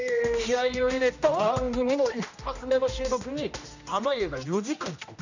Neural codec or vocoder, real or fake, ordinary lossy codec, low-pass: codec, 16 kHz, 1 kbps, X-Codec, HuBERT features, trained on general audio; fake; none; 7.2 kHz